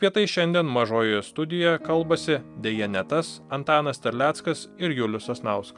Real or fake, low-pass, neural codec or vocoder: real; 10.8 kHz; none